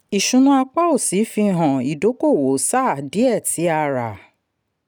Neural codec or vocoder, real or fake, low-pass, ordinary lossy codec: none; real; none; none